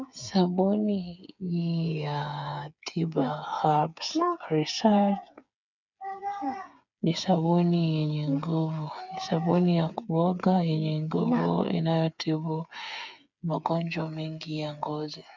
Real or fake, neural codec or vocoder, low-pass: fake; codec, 16 kHz, 8 kbps, FreqCodec, smaller model; 7.2 kHz